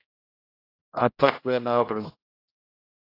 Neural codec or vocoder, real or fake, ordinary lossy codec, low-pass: codec, 16 kHz, 0.5 kbps, X-Codec, HuBERT features, trained on general audio; fake; AAC, 32 kbps; 5.4 kHz